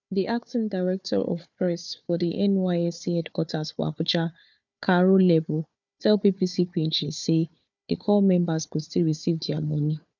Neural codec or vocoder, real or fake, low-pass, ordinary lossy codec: codec, 16 kHz, 4 kbps, FunCodec, trained on Chinese and English, 50 frames a second; fake; 7.2 kHz; none